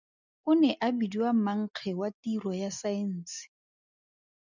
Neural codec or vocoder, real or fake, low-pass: none; real; 7.2 kHz